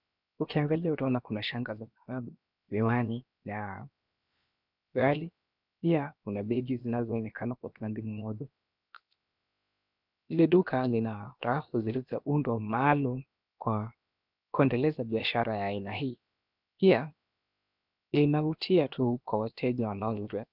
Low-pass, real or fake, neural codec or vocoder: 5.4 kHz; fake; codec, 16 kHz, 0.7 kbps, FocalCodec